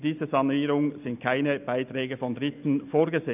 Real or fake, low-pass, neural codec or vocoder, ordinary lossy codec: real; 3.6 kHz; none; none